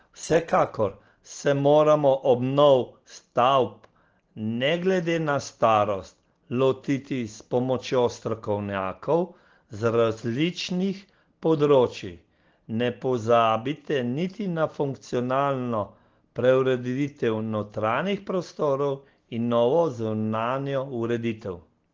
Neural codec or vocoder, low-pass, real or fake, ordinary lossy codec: none; 7.2 kHz; real; Opus, 16 kbps